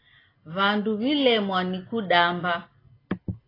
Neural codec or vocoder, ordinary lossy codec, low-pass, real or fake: none; AAC, 24 kbps; 5.4 kHz; real